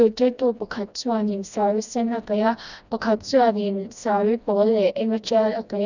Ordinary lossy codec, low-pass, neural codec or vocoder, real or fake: none; 7.2 kHz; codec, 16 kHz, 1 kbps, FreqCodec, smaller model; fake